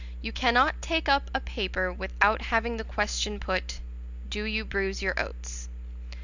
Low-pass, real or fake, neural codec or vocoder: 7.2 kHz; real; none